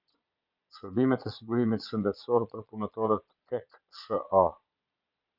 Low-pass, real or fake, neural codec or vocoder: 5.4 kHz; fake; vocoder, 22.05 kHz, 80 mel bands, Vocos